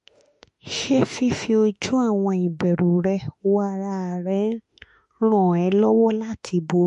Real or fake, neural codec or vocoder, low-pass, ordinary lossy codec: fake; autoencoder, 48 kHz, 32 numbers a frame, DAC-VAE, trained on Japanese speech; 14.4 kHz; MP3, 48 kbps